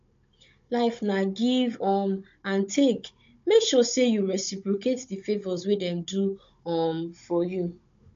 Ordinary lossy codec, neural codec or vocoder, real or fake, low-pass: MP3, 48 kbps; codec, 16 kHz, 16 kbps, FunCodec, trained on Chinese and English, 50 frames a second; fake; 7.2 kHz